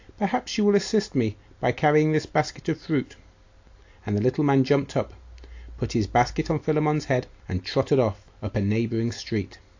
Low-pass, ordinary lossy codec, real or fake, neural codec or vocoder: 7.2 kHz; AAC, 48 kbps; real; none